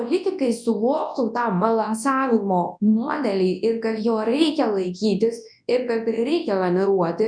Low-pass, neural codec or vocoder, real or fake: 9.9 kHz; codec, 24 kHz, 0.9 kbps, WavTokenizer, large speech release; fake